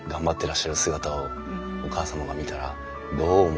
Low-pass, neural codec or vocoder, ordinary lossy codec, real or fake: none; none; none; real